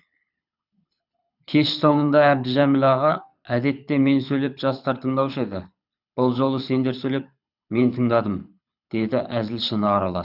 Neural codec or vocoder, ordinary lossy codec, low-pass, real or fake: codec, 24 kHz, 6 kbps, HILCodec; none; 5.4 kHz; fake